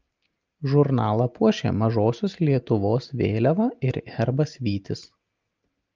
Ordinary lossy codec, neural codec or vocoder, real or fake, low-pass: Opus, 24 kbps; none; real; 7.2 kHz